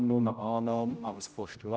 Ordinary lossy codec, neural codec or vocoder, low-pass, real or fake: none; codec, 16 kHz, 0.5 kbps, X-Codec, HuBERT features, trained on general audio; none; fake